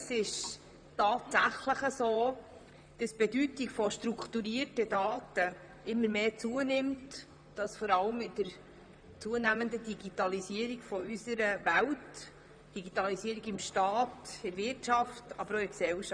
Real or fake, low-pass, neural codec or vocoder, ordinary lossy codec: fake; 9.9 kHz; vocoder, 44.1 kHz, 128 mel bands, Pupu-Vocoder; none